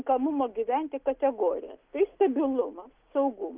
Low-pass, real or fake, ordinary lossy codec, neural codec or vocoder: 3.6 kHz; real; Opus, 32 kbps; none